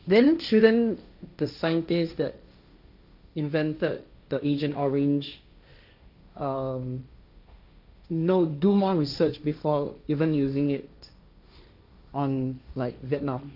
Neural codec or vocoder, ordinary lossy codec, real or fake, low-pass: codec, 16 kHz, 1.1 kbps, Voila-Tokenizer; none; fake; 5.4 kHz